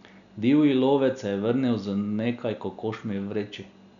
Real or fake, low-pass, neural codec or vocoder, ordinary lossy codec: real; 7.2 kHz; none; none